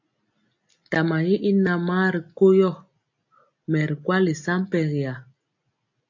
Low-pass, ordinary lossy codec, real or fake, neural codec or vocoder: 7.2 kHz; AAC, 48 kbps; real; none